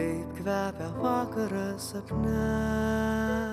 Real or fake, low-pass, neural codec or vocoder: real; 14.4 kHz; none